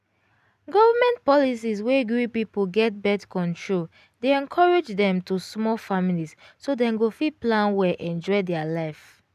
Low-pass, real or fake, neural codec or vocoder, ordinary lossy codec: 14.4 kHz; real; none; none